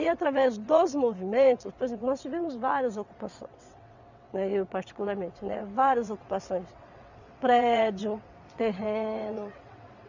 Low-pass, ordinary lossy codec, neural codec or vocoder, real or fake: 7.2 kHz; none; vocoder, 22.05 kHz, 80 mel bands, WaveNeXt; fake